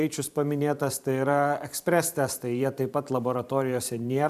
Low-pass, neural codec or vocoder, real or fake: 14.4 kHz; vocoder, 44.1 kHz, 128 mel bands every 512 samples, BigVGAN v2; fake